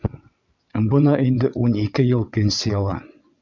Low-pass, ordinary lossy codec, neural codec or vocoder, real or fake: 7.2 kHz; MP3, 64 kbps; vocoder, 22.05 kHz, 80 mel bands, WaveNeXt; fake